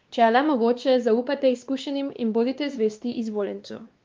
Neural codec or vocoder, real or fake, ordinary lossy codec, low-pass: codec, 16 kHz, 2 kbps, X-Codec, WavLM features, trained on Multilingual LibriSpeech; fake; Opus, 32 kbps; 7.2 kHz